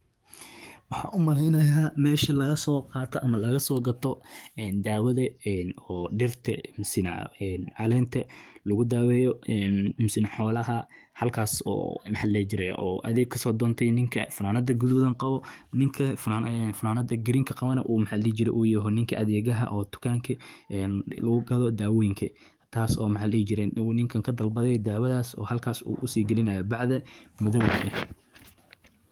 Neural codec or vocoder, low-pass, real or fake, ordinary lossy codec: codec, 44.1 kHz, 7.8 kbps, DAC; 19.8 kHz; fake; Opus, 32 kbps